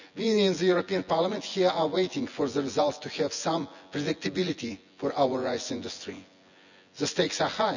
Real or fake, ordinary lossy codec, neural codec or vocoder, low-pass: fake; none; vocoder, 24 kHz, 100 mel bands, Vocos; 7.2 kHz